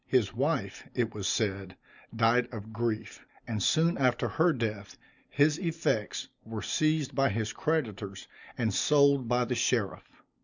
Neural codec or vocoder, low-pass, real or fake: none; 7.2 kHz; real